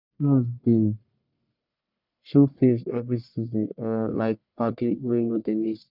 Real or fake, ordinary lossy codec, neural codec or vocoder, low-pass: fake; none; codec, 44.1 kHz, 1.7 kbps, Pupu-Codec; 5.4 kHz